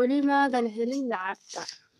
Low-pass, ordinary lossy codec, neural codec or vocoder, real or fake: 14.4 kHz; none; codec, 32 kHz, 1.9 kbps, SNAC; fake